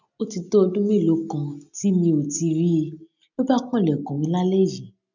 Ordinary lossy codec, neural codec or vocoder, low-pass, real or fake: none; none; 7.2 kHz; real